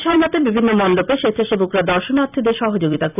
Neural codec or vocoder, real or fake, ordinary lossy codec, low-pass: vocoder, 44.1 kHz, 128 mel bands every 256 samples, BigVGAN v2; fake; none; 3.6 kHz